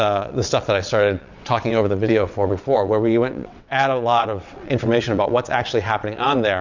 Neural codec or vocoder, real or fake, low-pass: vocoder, 22.05 kHz, 80 mel bands, WaveNeXt; fake; 7.2 kHz